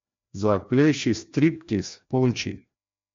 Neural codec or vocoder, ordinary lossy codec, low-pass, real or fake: codec, 16 kHz, 1 kbps, FreqCodec, larger model; MP3, 48 kbps; 7.2 kHz; fake